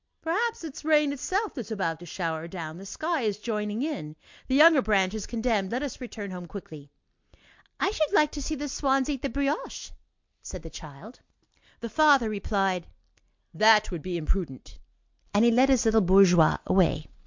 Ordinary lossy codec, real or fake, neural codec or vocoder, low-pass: MP3, 64 kbps; real; none; 7.2 kHz